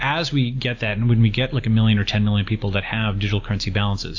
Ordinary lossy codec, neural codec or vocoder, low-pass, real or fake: AAC, 48 kbps; none; 7.2 kHz; real